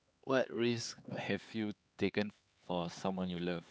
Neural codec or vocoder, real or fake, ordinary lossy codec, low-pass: codec, 16 kHz, 4 kbps, X-Codec, HuBERT features, trained on LibriSpeech; fake; none; none